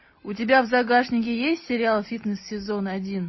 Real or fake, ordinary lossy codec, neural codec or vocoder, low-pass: real; MP3, 24 kbps; none; 7.2 kHz